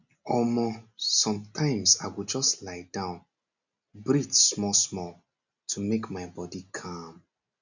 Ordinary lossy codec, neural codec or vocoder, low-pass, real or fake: none; none; 7.2 kHz; real